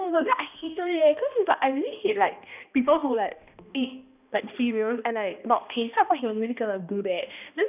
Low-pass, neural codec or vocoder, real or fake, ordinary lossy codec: 3.6 kHz; codec, 16 kHz, 1 kbps, X-Codec, HuBERT features, trained on balanced general audio; fake; none